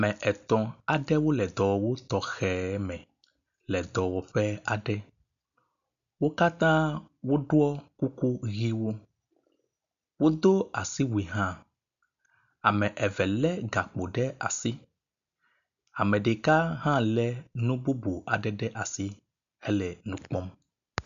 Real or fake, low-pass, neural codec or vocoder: real; 7.2 kHz; none